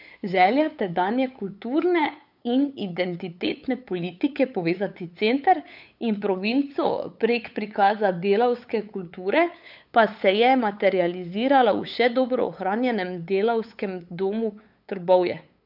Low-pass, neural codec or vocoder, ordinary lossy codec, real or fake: 5.4 kHz; codec, 16 kHz, 8 kbps, FunCodec, trained on LibriTTS, 25 frames a second; none; fake